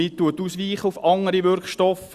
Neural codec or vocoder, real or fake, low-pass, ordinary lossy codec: none; real; 14.4 kHz; Opus, 64 kbps